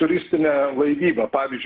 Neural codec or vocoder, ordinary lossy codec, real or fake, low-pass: none; Opus, 16 kbps; real; 5.4 kHz